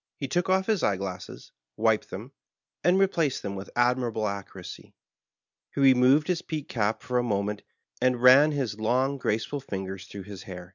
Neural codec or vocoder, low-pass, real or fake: none; 7.2 kHz; real